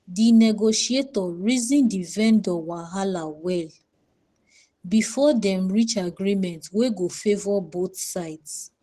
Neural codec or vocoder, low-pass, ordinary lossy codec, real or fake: none; 14.4 kHz; Opus, 16 kbps; real